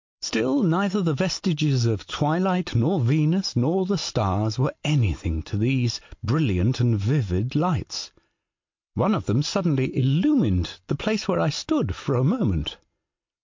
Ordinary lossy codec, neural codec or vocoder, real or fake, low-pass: MP3, 48 kbps; none; real; 7.2 kHz